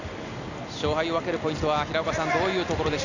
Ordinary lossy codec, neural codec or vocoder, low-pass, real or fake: none; none; 7.2 kHz; real